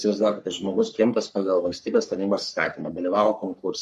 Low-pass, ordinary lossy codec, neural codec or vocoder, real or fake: 14.4 kHz; MP3, 64 kbps; codec, 44.1 kHz, 3.4 kbps, Pupu-Codec; fake